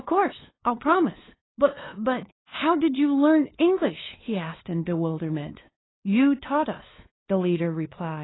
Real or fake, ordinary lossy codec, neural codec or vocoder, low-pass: fake; AAC, 16 kbps; codec, 16 kHz, 2 kbps, FunCodec, trained on LibriTTS, 25 frames a second; 7.2 kHz